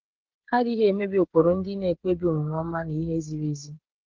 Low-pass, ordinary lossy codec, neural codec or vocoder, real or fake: 7.2 kHz; Opus, 16 kbps; codec, 16 kHz, 8 kbps, FreqCodec, smaller model; fake